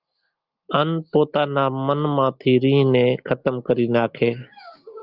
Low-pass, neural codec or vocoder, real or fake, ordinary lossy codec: 5.4 kHz; none; real; Opus, 24 kbps